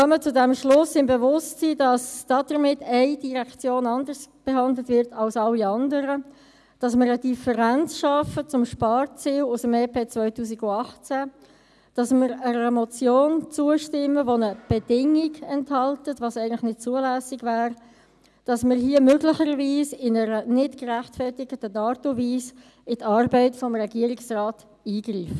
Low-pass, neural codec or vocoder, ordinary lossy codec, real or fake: none; none; none; real